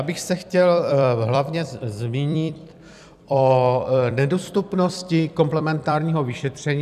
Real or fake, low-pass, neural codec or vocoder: fake; 14.4 kHz; vocoder, 44.1 kHz, 128 mel bands every 256 samples, BigVGAN v2